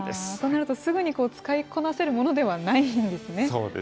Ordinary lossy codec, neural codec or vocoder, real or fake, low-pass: none; none; real; none